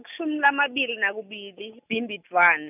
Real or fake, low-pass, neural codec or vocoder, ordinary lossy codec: real; 3.6 kHz; none; none